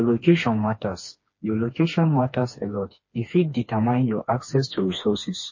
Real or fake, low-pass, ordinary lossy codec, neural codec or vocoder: fake; 7.2 kHz; MP3, 32 kbps; codec, 16 kHz, 2 kbps, FreqCodec, smaller model